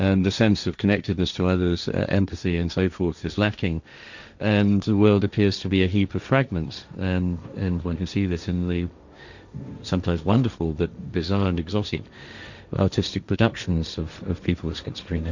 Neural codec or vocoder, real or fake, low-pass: codec, 16 kHz, 1.1 kbps, Voila-Tokenizer; fake; 7.2 kHz